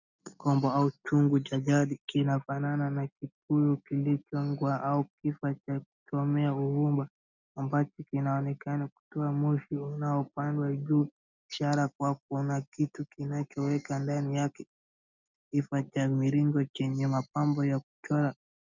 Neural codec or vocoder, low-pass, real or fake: none; 7.2 kHz; real